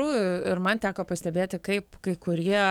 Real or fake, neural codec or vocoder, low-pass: fake; codec, 44.1 kHz, 7.8 kbps, DAC; 19.8 kHz